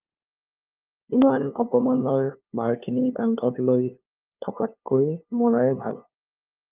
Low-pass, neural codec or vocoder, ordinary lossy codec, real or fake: 3.6 kHz; codec, 16 kHz, 2 kbps, FunCodec, trained on LibriTTS, 25 frames a second; Opus, 32 kbps; fake